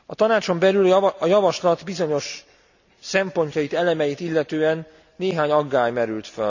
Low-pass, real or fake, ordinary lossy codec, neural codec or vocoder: 7.2 kHz; real; none; none